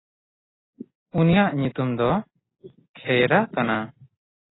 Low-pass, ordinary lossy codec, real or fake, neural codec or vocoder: 7.2 kHz; AAC, 16 kbps; real; none